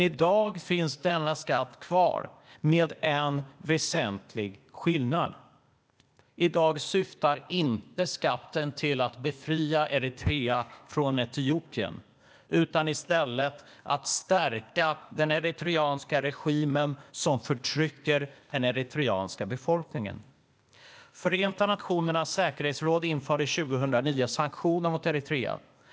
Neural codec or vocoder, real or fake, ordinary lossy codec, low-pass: codec, 16 kHz, 0.8 kbps, ZipCodec; fake; none; none